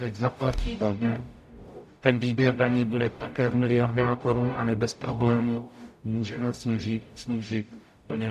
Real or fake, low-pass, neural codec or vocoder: fake; 14.4 kHz; codec, 44.1 kHz, 0.9 kbps, DAC